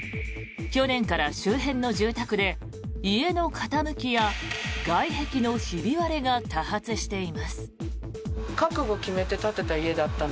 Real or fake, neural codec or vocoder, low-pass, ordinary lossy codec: real; none; none; none